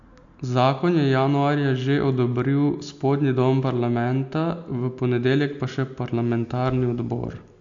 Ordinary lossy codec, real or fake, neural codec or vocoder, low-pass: AAC, 64 kbps; real; none; 7.2 kHz